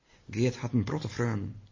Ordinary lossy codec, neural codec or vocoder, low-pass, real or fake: MP3, 32 kbps; none; 7.2 kHz; real